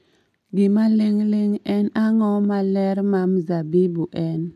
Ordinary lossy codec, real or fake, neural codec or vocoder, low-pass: none; real; none; 14.4 kHz